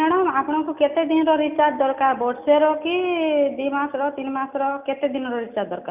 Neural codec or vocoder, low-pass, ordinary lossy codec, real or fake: none; 3.6 kHz; none; real